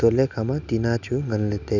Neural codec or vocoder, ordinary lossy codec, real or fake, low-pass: none; none; real; 7.2 kHz